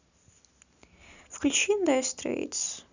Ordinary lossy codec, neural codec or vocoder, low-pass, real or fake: none; none; 7.2 kHz; real